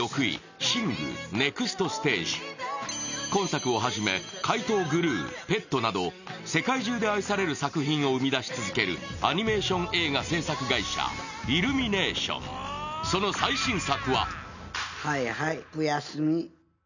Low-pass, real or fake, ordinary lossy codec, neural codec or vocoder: 7.2 kHz; real; none; none